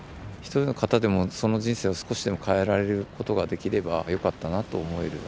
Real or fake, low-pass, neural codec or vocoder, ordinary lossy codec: real; none; none; none